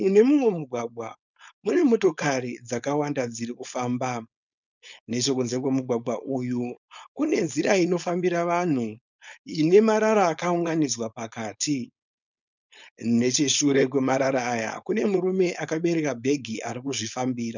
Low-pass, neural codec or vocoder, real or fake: 7.2 kHz; codec, 16 kHz, 4.8 kbps, FACodec; fake